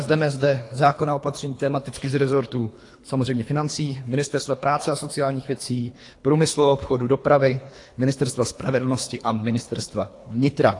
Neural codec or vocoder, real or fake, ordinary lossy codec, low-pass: codec, 24 kHz, 3 kbps, HILCodec; fake; AAC, 48 kbps; 10.8 kHz